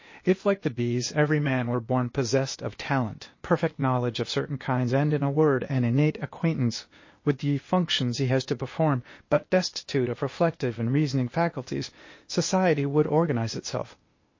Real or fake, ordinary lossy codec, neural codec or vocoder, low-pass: fake; MP3, 32 kbps; codec, 16 kHz, 0.8 kbps, ZipCodec; 7.2 kHz